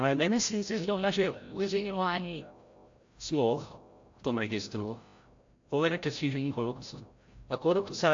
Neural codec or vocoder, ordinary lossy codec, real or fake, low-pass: codec, 16 kHz, 0.5 kbps, FreqCodec, larger model; AAC, 48 kbps; fake; 7.2 kHz